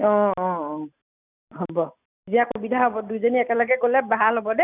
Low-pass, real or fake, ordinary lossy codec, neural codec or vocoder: 3.6 kHz; real; none; none